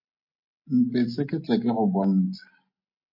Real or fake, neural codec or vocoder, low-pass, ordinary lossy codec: real; none; 5.4 kHz; MP3, 32 kbps